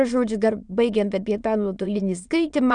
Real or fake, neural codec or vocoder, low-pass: fake; autoencoder, 22.05 kHz, a latent of 192 numbers a frame, VITS, trained on many speakers; 9.9 kHz